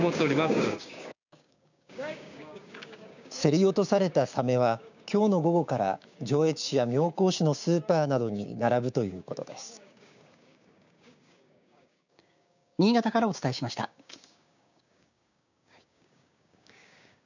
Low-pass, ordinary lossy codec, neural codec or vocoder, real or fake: 7.2 kHz; none; codec, 16 kHz, 6 kbps, DAC; fake